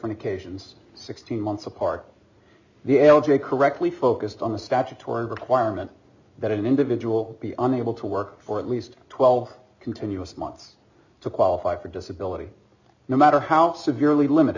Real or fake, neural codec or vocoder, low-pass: real; none; 7.2 kHz